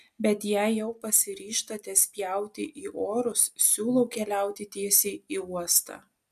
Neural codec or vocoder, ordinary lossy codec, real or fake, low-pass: none; MP3, 96 kbps; real; 14.4 kHz